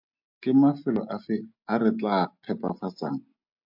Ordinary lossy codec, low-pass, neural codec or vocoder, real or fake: MP3, 48 kbps; 5.4 kHz; none; real